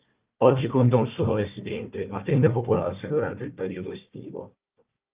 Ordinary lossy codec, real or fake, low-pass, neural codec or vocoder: Opus, 32 kbps; fake; 3.6 kHz; codec, 16 kHz, 1 kbps, FunCodec, trained on Chinese and English, 50 frames a second